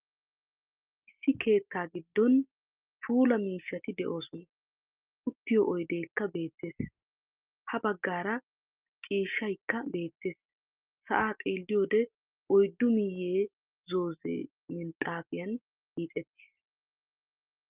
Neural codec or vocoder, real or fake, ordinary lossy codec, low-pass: none; real; Opus, 32 kbps; 3.6 kHz